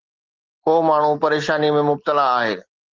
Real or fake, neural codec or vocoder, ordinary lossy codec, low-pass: real; none; Opus, 16 kbps; 7.2 kHz